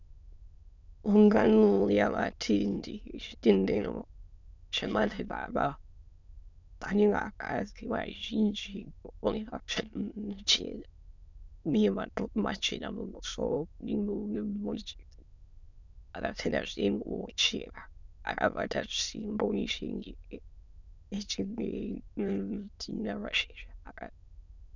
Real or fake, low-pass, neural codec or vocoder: fake; 7.2 kHz; autoencoder, 22.05 kHz, a latent of 192 numbers a frame, VITS, trained on many speakers